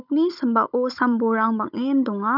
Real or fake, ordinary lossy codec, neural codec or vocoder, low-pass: fake; none; codec, 16 kHz, 16 kbps, FunCodec, trained on Chinese and English, 50 frames a second; 5.4 kHz